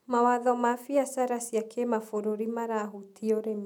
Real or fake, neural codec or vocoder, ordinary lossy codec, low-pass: real; none; none; 19.8 kHz